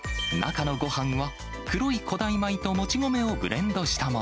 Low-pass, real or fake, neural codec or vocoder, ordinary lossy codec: none; real; none; none